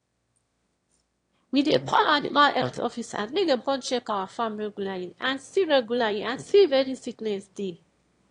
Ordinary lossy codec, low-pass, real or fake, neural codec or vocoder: AAC, 48 kbps; 9.9 kHz; fake; autoencoder, 22.05 kHz, a latent of 192 numbers a frame, VITS, trained on one speaker